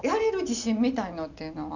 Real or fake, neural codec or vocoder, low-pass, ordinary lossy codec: real; none; 7.2 kHz; none